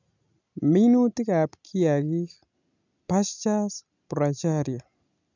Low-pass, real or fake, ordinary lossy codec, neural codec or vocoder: 7.2 kHz; real; none; none